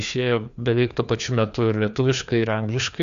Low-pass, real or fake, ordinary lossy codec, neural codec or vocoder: 7.2 kHz; fake; Opus, 64 kbps; codec, 16 kHz, 2 kbps, FreqCodec, larger model